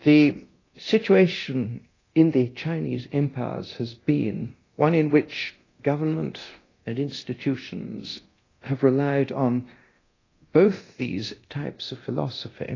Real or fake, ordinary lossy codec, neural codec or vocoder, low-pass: fake; AAC, 32 kbps; codec, 24 kHz, 0.9 kbps, DualCodec; 7.2 kHz